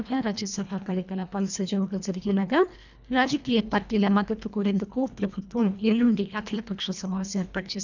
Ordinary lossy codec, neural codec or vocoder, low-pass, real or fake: none; codec, 24 kHz, 1.5 kbps, HILCodec; 7.2 kHz; fake